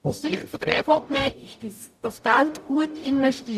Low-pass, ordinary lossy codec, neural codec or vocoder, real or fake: 14.4 kHz; none; codec, 44.1 kHz, 0.9 kbps, DAC; fake